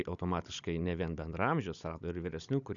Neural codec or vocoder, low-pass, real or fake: codec, 16 kHz, 16 kbps, FunCodec, trained on Chinese and English, 50 frames a second; 7.2 kHz; fake